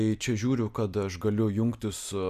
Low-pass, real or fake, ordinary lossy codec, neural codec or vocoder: 14.4 kHz; real; Opus, 64 kbps; none